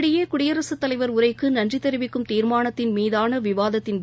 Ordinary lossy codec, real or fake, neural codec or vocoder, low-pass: none; real; none; none